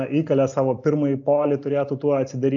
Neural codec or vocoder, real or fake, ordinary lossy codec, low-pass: none; real; AAC, 64 kbps; 7.2 kHz